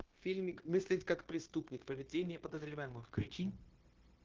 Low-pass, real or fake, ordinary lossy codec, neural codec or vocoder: 7.2 kHz; fake; Opus, 16 kbps; codec, 16 kHz, 0.9 kbps, LongCat-Audio-Codec